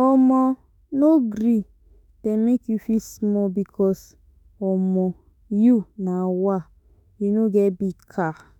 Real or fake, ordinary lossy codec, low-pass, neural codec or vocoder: fake; none; 19.8 kHz; autoencoder, 48 kHz, 32 numbers a frame, DAC-VAE, trained on Japanese speech